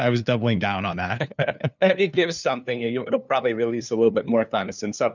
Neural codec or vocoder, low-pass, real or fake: codec, 16 kHz, 2 kbps, FunCodec, trained on LibriTTS, 25 frames a second; 7.2 kHz; fake